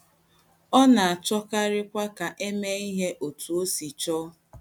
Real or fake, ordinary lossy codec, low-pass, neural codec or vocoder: real; none; none; none